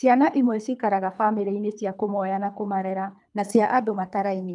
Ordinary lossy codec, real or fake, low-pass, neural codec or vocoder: none; fake; 10.8 kHz; codec, 24 kHz, 3 kbps, HILCodec